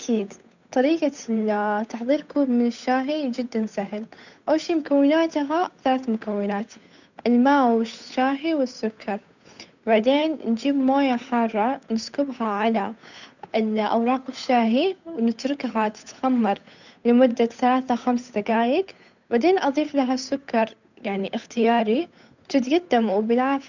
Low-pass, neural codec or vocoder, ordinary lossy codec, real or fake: 7.2 kHz; codec, 16 kHz, 8 kbps, FunCodec, trained on Chinese and English, 25 frames a second; none; fake